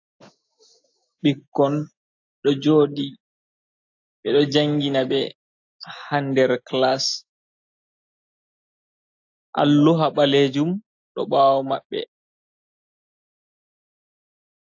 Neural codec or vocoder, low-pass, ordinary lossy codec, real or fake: none; 7.2 kHz; AAC, 48 kbps; real